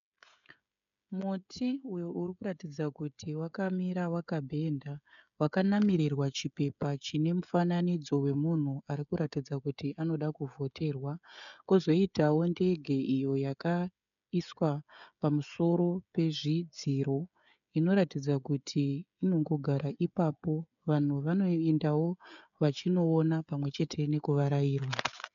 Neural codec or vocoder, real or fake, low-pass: codec, 16 kHz, 16 kbps, FreqCodec, smaller model; fake; 7.2 kHz